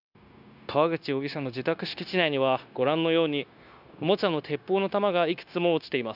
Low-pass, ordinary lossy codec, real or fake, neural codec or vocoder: 5.4 kHz; none; fake; codec, 16 kHz, 0.9 kbps, LongCat-Audio-Codec